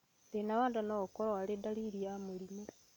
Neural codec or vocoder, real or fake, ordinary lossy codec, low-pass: none; real; none; 19.8 kHz